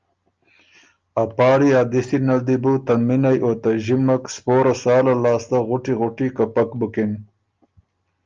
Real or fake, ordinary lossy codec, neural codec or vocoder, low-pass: real; Opus, 24 kbps; none; 7.2 kHz